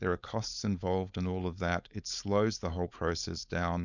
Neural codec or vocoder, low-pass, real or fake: codec, 16 kHz, 4.8 kbps, FACodec; 7.2 kHz; fake